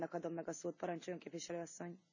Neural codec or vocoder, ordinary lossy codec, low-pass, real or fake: none; none; 7.2 kHz; real